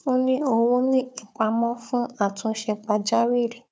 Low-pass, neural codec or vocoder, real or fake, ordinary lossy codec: none; codec, 16 kHz, 4 kbps, FunCodec, trained on Chinese and English, 50 frames a second; fake; none